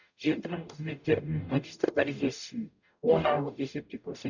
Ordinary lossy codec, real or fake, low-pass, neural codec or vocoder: none; fake; 7.2 kHz; codec, 44.1 kHz, 0.9 kbps, DAC